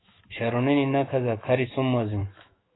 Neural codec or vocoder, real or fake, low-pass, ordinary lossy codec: autoencoder, 48 kHz, 128 numbers a frame, DAC-VAE, trained on Japanese speech; fake; 7.2 kHz; AAC, 16 kbps